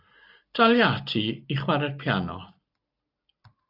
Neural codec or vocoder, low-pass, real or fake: none; 5.4 kHz; real